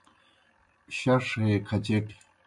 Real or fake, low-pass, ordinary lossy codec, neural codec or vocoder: real; 10.8 kHz; MP3, 96 kbps; none